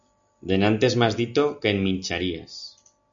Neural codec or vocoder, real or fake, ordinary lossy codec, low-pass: none; real; MP3, 64 kbps; 7.2 kHz